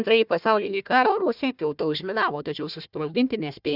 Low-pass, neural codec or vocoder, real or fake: 5.4 kHz; codec, 16 kHz, 1 kbps, FunCodec, trained on Chinese and English, 50 frames a second; fake